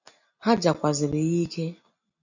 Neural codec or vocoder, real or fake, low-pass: none; real; 7.2 kHz